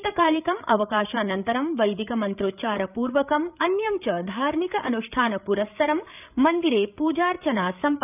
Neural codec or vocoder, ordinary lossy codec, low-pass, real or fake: codec, 16 kHz, 8 kbps, FreqCodec, larger model; none; 3.6 kHz; fake